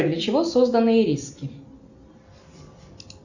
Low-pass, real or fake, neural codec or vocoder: 7.2 kHz; real; none